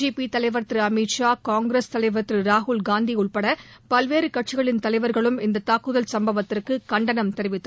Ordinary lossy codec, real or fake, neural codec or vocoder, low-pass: none; real; none; none